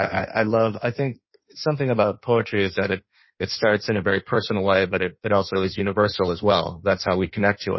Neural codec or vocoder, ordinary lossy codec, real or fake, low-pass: codec, 16 kHz in and 24 kHz out, 1.1 kbps, FireRedTTS-2 codec; MP3, 24 kbps; fake; 7.2 kHz